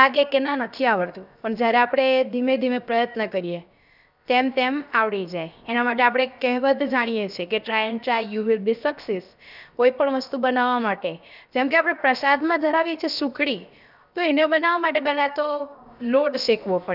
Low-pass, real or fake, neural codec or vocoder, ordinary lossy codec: 5.4 kHz; fake; codec, 16 kHz, about 1 kbps, DyCAST, with the encoder's durations; none